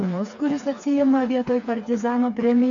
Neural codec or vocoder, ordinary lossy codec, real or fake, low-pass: codec, 16 kHz, 4 kbps, FreqCodec, smaller model; MP3, 64 kbps; fake; 7.2 kHz